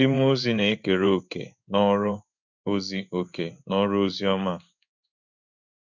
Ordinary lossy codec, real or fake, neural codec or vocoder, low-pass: none; fake; codec, 16 kHz in and 24 kHz out, 1 kbps, XY-Tokenizer; 7.2 kHz